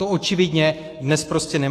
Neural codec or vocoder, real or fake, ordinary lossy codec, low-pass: autoencoder, 48 kHz, 128 numbers a frame, DAC-VAE, trained on Japanese speech; fake; AAC, 48 kbps; 14.4 kHz